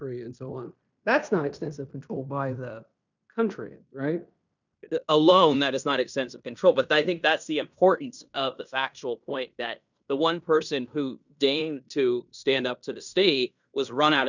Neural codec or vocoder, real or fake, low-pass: codec, 16 kHz in and 24 kHz out, 0.9 kbps, LongCat-Audio-Codec, fine tuned four codebook decoder; fake; 7.2 kHz